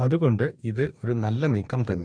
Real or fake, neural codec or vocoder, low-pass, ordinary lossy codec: fake; codec, 44.1 kHz, 2.6 kbps, DAC; 9.9 kHz; none